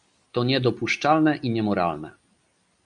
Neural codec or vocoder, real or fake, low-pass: none; real; 9.9 kHz